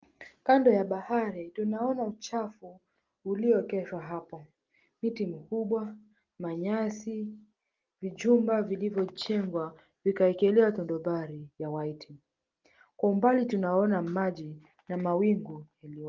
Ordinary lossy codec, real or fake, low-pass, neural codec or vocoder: Opus, 32 kbps; real; 7.2 kHz; none